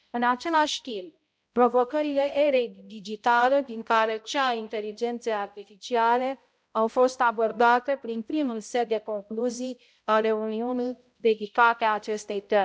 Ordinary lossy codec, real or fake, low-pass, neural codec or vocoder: none; fake; none; codec, 16 kHz, 0.5 kbps, X-Codec, HuBERT features, trained on balanced general audio